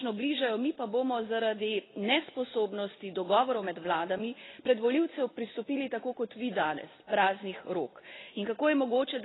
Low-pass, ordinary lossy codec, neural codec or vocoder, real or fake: 7.2 kHz; AAC, 16 kbps; none; real